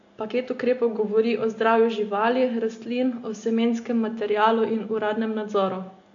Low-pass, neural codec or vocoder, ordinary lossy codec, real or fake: 7.2 kHz; none; AAC, 48 kbps; real